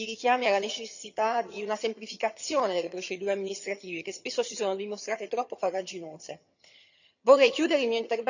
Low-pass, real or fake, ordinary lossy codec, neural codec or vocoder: 7.2 kHz; fake; none; vocoder, 22.05 kHz, 80 mel bands, HiFi-GAN